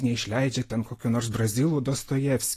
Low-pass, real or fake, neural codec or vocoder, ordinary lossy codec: 14.4 kHz; real; none; AAC, 48 kbps